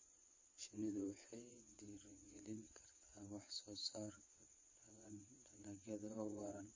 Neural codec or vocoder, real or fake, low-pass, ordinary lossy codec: vocoder, 22.05 kHz, 80 mel bands, WaveNeXt; fake; 7.2 kHz; MP3, 32 kbps